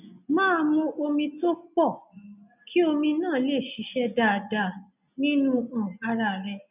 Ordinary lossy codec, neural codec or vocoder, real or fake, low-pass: none; none; real; 3.6 kHz